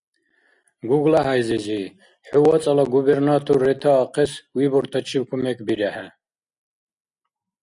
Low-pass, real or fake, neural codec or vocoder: 10.8 kHz; real; none